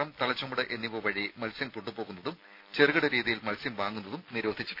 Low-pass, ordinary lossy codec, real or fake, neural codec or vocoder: 5.4 kHz; none; real; none